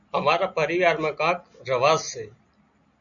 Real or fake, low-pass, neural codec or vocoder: real; 7.2 kHz; none